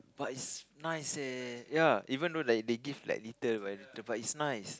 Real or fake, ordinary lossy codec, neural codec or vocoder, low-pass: real; none; none; none